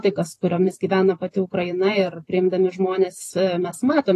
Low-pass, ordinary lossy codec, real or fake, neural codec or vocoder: 14.4 kHz; AAC, 48 kbps; fake; vocoder, 44.1 kHz, 128 mel bands every 512 samples, BigVGAN v2